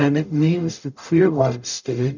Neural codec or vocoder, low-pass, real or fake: codec, 44.1 kHz, 0.9 kbps, DAC; 7.2 kHz; fake